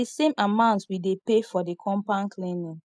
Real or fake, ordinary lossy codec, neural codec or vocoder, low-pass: real; none; none; none